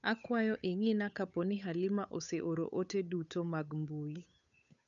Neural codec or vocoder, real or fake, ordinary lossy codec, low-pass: codec, 16 kHz, 8 kbps, FunCodec, trained on Chinese and English, 25 frames a second; fake; none; 7.2 kHz